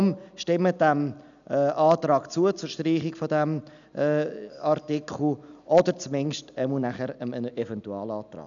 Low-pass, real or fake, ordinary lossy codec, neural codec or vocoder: 7.2 kHz; real; none; none